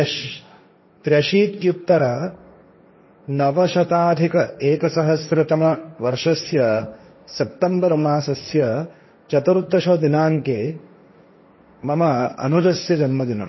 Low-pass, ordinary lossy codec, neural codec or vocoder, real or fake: 7.2 kHz; MP3, 24 kbps; codec, 16 kHz, 1.1 kbps, Voila-Tokenizer; fake